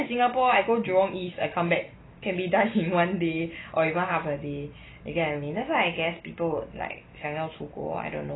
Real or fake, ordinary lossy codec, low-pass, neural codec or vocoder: real; AAC, 16 kbps; 7.2 kHz; none